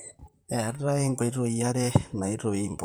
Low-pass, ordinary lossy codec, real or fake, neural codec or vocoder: none; none; fake; vocoder, 44.1 kHz, 128 mel bands, Pupu-Vocoder